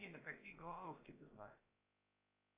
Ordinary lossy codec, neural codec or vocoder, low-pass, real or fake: AAC, 24 kbps; codec, 16 kHz, about 1 kbps, DyCAST, with the encoder's durations; 3.6 kHz; fake